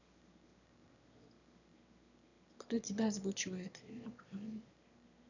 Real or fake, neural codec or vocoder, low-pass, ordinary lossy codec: fake; autoencoder, 22.05 kHz, a latent of 192 numbers a frame, VITS, trained on one speaker; 7.2 kHz; none